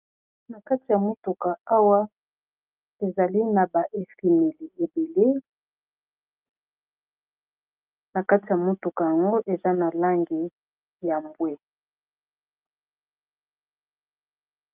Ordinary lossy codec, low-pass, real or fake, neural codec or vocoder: Opus, 32 kbps; 3.6 kHz; real; none